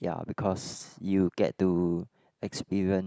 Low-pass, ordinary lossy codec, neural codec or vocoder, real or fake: none; none; none; real